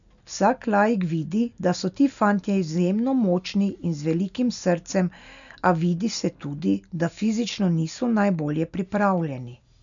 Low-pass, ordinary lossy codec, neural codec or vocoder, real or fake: 7.2 kHz; none; none; real